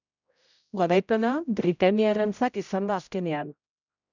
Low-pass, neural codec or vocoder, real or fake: 7.2 kHz; codec, 16 kHz, 0.5 kbps, X-Codec, HuBERT features, trained on general audio; fake